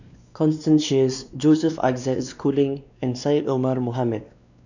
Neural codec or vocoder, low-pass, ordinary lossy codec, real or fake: codec, 16 kHz, 2 kbps, X-Codec, WavLM features, trained on Multilingual LibriSpeech; 7.2 kHz; none; fake